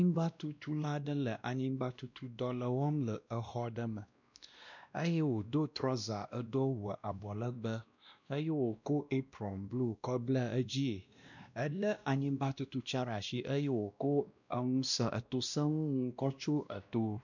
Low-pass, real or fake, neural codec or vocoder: 7.2 kHz; fake; codec, 16 kHz, 1 kbps, X-Codec, WavLM features, trained on Multilingual LibriSpeech